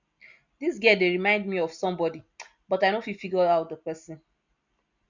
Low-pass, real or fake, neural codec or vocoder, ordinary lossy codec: 7.2 kHz; real; none; none